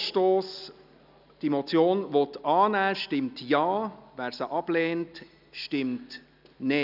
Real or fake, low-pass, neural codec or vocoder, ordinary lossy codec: real; 5.4 kHz; none; none